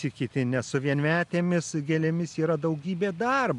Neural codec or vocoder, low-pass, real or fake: none; 10.8 kHz; real